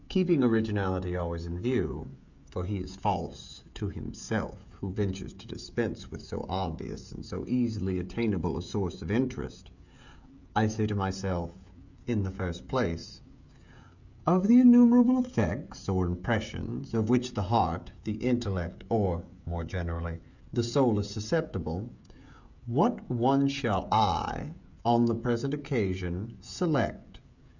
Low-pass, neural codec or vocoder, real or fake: 7.2 kHz; codec, 16 kHz, 16 kbps, FreqCodec, smaller model; fake